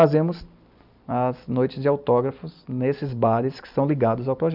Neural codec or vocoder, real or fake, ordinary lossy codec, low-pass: none; real; none; 5.4 kHz